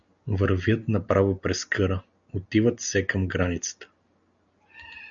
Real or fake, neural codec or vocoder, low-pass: real; none; 7.2 kHz